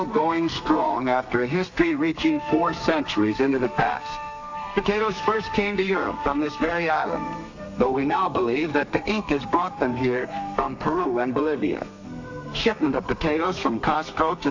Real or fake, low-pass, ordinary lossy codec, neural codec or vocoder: fake; 7.2 kHz; AAC, 48 kbps; codec, 32 kHz, 1.9 kbps, SNAC